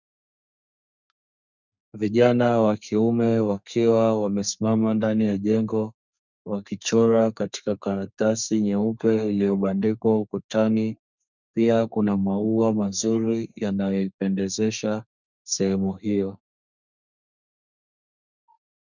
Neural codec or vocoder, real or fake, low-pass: codec, 32 kHz, 1.9 kbps, SNAC; fake; 7.2 kHz